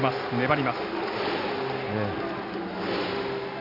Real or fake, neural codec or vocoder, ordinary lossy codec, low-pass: real; none; none; 5.4 kHz